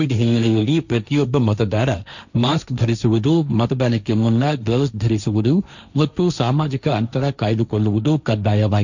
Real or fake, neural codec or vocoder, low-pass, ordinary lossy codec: fake; codec, 16 kHz, 1.1 kbps, Voila-Tokenizer; 7.2 kHz; none